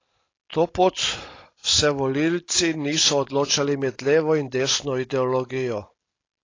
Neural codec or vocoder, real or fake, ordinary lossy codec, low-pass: none; real; AAC, 32 kbps; 7.2 kHz